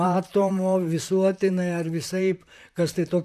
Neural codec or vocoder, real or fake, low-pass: vocoder, 44.1 kHz, 128 mel bands, Pupu-Vocoder; fake; 14.4 kHz